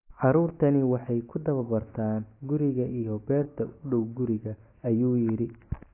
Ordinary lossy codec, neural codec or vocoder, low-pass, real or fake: AAC, 24 kbps; none; 3.6 kHz; real